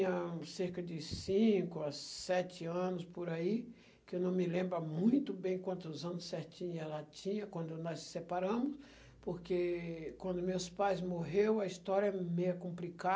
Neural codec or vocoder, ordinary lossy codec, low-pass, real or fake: none; none; none; real